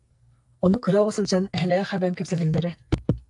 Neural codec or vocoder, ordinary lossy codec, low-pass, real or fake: codec, 44.1 kHz, 2.6 kbps, SNAC; AAC, 64 kbps; 10.8 kHz; fake